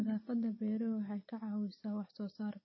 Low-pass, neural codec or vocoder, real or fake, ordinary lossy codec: 7.2 kHz; none; real; MP3, 24 kbps